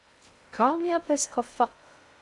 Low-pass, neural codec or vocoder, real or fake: 10.8 kHz; codec, 16 kHz in and 24 kHz out, 0.6 kbps, FocalCodec, streaming, 2048 codes; fake